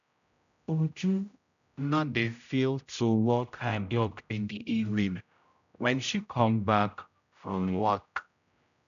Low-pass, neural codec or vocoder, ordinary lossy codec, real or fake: 7.2 kHz; codec, 16 kHz, 0.5 kbps, X-Codec, HuBERT features, trained on general audio; none; fake